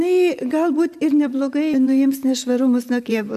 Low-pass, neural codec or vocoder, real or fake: 14.4 kHz; vocoder, 44.1 kHz, 128 mel bands, Pupu-Vocoder; fake